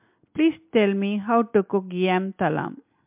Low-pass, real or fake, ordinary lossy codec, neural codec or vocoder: 3.6 kHz; real; MP3, 32 kbps; none